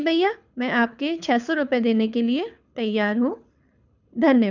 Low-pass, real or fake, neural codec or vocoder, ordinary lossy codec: 7.2 kHz; fake; codec, 24 kHz, 6 kbps, HILCodec; none